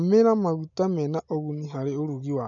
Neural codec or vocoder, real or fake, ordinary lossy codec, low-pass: none; real; none; 7.2 kHz